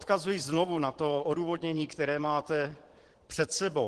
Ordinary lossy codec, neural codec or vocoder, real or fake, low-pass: Opus, 16 kbps; vocoder, 22.05 kHz, 80 mel bands, Vocos; fake; 9.9 kHz